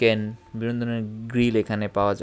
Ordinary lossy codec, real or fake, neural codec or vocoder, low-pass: none; real; none; none